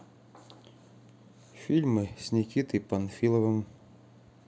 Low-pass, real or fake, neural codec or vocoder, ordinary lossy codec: none; real; none; none